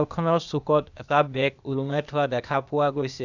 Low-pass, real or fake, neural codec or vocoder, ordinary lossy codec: 7.2 kHz; fake; codec, 16 kHz, 0.8 kbps, ZipCodec; none